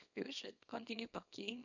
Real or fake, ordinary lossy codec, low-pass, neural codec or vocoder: fake; none; 7.2 kHz; codec, 24 kHz, 0.9 kbps, WavTokenizer, small release